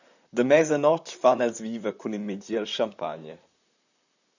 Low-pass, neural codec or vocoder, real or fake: 7.2 kHz; vocoder, 44.1 kHz, 128 mel bands, Pupu-Vocoder; fake